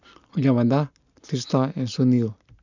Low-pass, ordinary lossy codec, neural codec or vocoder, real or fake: 7.2 kHz; none; none; real